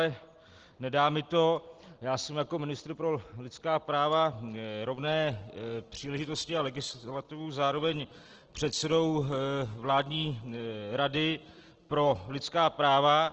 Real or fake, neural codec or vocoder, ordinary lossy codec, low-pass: real; none; Opus, 16 kbps; 7.2 kHz